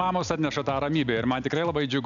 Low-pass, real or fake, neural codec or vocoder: 7.2 kHz; real; none